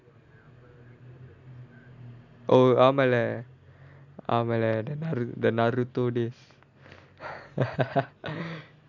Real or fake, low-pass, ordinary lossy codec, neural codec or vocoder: real; 7.2 kHz; none; none